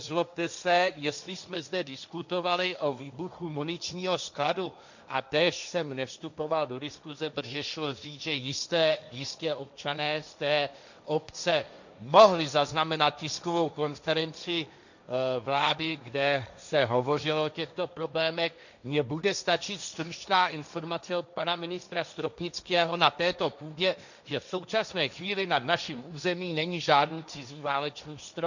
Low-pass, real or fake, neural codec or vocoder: 7.2 kHz; fake; codec, 16 kHz, 1.1 kbps, Voila-Tokenizer